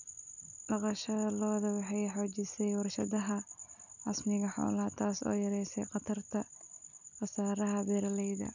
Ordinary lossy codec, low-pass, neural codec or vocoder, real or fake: none; 7.2 kHz; none; real